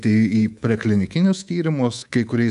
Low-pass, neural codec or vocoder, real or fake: 10.8 kHz; codec, 24 kHz, 3.1 kbps, DualCodec; fake